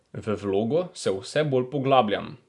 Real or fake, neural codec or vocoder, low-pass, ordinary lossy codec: real; none; 10.8 kHz; none